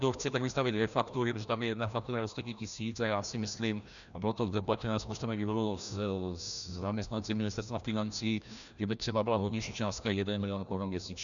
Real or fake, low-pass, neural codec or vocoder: fake; 7.2 kHz; codec, 16 kHz, 1 kbps, FreqCodec, larger model